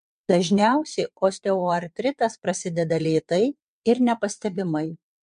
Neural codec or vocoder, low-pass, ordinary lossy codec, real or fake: vocoder, 22.05 kHz, 80 mel bands, WaveNeXt; 9.9 kHz; MP3, 64 kbps; fake